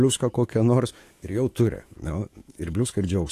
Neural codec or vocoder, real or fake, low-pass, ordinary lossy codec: autoencoder, 48 kHz, 128 numbers a frame, DAC-VAE, trained on Japanese speech; fake; 14.4 kHz; AAC, 48 kbps